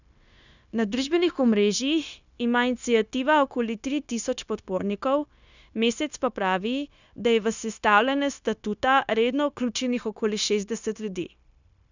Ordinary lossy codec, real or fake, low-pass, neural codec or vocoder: none; fake; 7.2 kHz; codec, 16 kHz, 0.9 kbps, LongCat-Audio-Codec